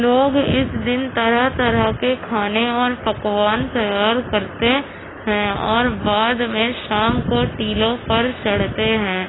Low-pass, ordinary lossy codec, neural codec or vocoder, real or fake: 7.2 kHz; AAC, 16 kbps; none; real